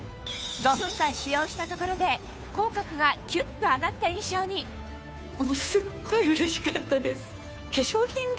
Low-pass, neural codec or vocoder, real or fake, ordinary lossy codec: none; codec, 16 kHz, 2 kbps, FunCodec, trained on Chinese and English, 25 frames a second; fake; none